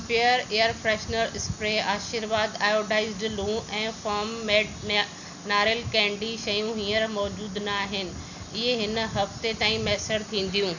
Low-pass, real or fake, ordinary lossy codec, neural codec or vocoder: 7.2 kHz; real; none; none